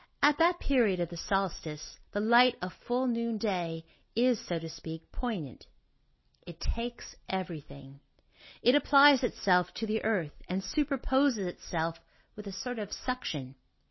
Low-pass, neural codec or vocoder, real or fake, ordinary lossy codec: 7.2 kHz; none; real; MP3, 24 kbps